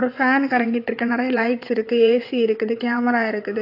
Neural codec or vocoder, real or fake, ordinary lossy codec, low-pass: vocoder, 22.05 kHz, 80 mel bands, WaveNeXt; fake; AAC, 32 kbps; 5.4 kHz